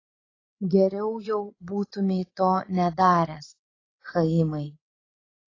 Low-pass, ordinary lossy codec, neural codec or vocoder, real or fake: 7.2 kHz; AAC, 32 kbps; codec, 16 kHz, 8 kbps, FreqCodec, larger model; fake